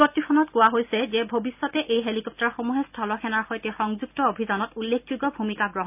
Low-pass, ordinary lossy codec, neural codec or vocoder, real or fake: 3.6 kHz; none; none; real